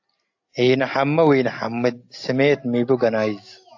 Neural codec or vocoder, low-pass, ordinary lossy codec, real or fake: none; 7.2 kHz; AAC, 48 kbps; real